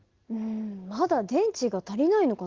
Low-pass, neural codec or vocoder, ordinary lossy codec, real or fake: 7.2 kHz; none; Opus, 16 kbps; real